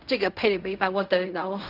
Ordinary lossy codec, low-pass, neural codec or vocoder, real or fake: none; 5.4 kHz; codec, 16 kHz in and 24 kHz out, 0.4 kbps, LongCat-Audio-Codec, fine tuned four codebook decoder; fake